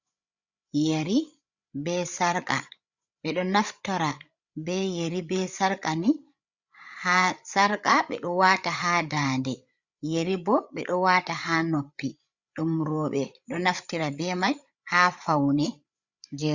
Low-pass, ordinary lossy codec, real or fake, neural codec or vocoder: 7.2 kHz; Opus, 64 kbps; fake; codec, 16 kHz, 8 kbps, FreqCodec, larger model